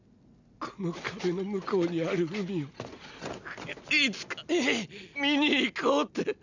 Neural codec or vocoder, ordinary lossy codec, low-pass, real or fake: none; none; 7.2 kHz; real